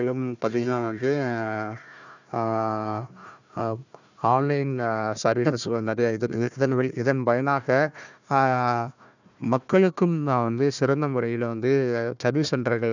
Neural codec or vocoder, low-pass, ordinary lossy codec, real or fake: codec, 16 kHz, 1 kbps, FunCodec, trained on Chinese and English, 50 frames a second; 7.2 kHz; none; fake